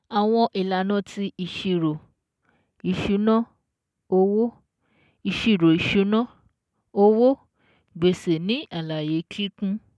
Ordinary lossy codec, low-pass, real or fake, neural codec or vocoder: none; none; real; none